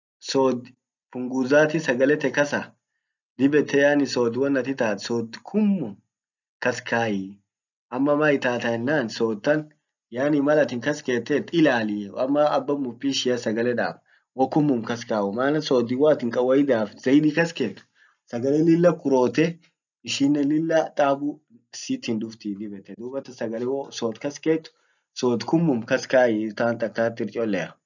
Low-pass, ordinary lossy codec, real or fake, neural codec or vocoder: 7.2 kHz; none; real; none